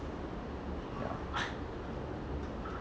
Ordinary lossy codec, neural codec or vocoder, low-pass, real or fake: none; none; none; real